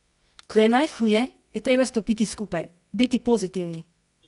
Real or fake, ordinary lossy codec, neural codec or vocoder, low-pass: fake; none; codec, 24 kHz, 0.9 kbps, WavTokenizer, medium music audio release; 10.8 kHz